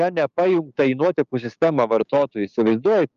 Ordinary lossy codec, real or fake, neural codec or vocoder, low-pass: Opus, 32 kbps; fake; codec, 16 kHz, 6 kbps, DAC; 7.2 kHz